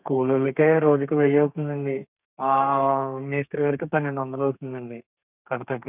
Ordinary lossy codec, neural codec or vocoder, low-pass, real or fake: none; codec, 32 kHz, 1.9 kbps, SNAC; 3.6 kHz; fake